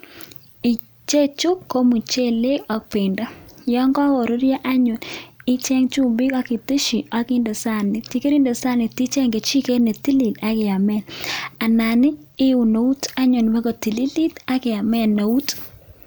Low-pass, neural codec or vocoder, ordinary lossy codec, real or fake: none; none; none; real